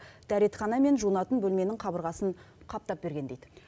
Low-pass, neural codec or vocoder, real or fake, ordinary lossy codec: none; none; real; none